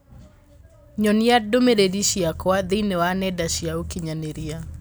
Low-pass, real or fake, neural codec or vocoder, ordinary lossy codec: none; real; none; none